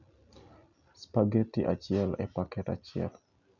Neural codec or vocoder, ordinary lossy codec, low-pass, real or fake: none; none; 7.2 kHz; real